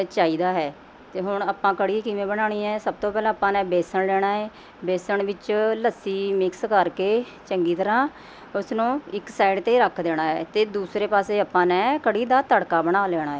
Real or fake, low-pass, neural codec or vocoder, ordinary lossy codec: real; none; none; none